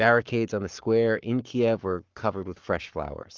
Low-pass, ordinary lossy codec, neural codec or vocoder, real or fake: 7.2 kHz; Opus, 32 kbps; codec, 44.1 kHz, 7.8 kbps, Pupu-Codec; fake